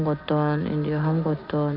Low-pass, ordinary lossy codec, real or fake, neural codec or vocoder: 5.4 kHz; none; real; none